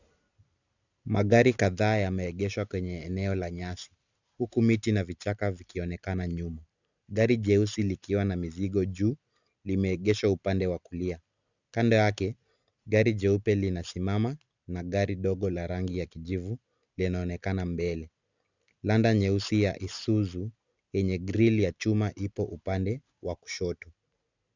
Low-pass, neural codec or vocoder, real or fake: 7.2 kHz; none; real